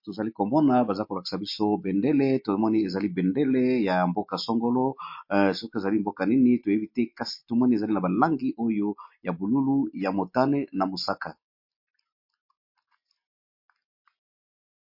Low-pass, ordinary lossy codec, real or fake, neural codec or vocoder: 5.4 kHz; MP3, 32 kbps; real; none